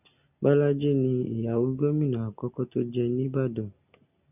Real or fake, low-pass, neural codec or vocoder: real; 3.6 kHz; none